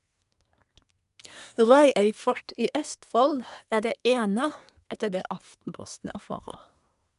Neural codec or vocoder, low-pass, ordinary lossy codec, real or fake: codec, 24 kHz, 1 kbps, SNAC; 10.8 kHz; none; fake